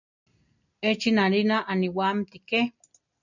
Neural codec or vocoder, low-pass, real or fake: none; 7.2 kHz; real